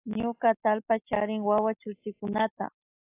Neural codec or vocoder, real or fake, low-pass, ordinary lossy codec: none; real; 3.6 kHz; AAC, 24 kbps